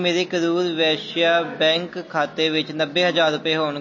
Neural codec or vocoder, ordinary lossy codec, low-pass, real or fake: none; MP3, 32 kbps; 7.2 kHz; real